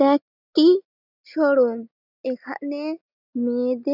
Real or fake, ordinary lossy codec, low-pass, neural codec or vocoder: real; none; 5.4 kHz; none